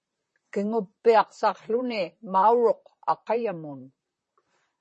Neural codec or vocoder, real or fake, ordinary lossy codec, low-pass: vocoder, 44.1 kHz, 128 mel bands, Pupu-Vocoder; fake; MP3, 32 kbps; 10.8 kHz